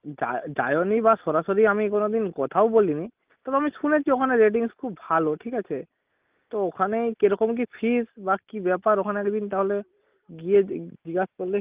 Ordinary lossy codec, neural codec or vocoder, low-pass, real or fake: Opus, 24 kbps; none; 3.6 kHz; real